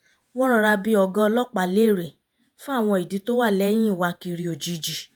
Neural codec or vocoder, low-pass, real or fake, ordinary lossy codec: vocoder, 48 kHz, 128 mel bands, Vocos; none; fake; none